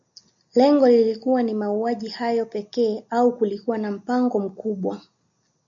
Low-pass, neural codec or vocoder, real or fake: 7.2 kHz; none; real